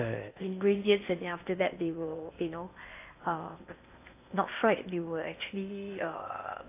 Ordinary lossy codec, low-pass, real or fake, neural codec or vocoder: AAC, 24 kbps; 3.6 kHz; fake; codec, 16 kHz in and 24 kHz out, 0.8 kbps, FocalCodec, streaming, 65536 codes